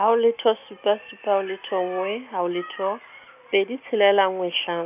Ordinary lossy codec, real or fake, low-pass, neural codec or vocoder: none; real; 3.6 kHz; none